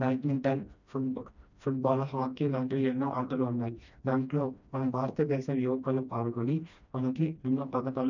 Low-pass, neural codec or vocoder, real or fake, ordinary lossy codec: 7.2 kHz; codec, 16 kHz, 1 kbps, FreqCodec, smaller model; fake; none